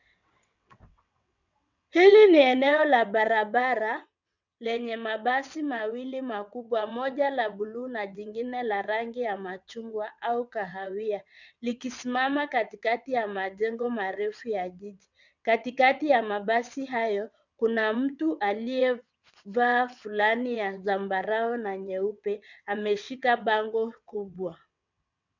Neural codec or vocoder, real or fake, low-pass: vocoder, 22.05 kHz, 80 mel bands, WaveNeXt; fake; 7.2 kHz